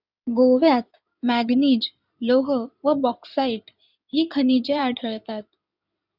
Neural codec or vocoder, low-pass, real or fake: codec, 16 kHz in and 24 kHz out, 2.2 kbps, FireRedTTS-2 codec; 5.4 kHz; fake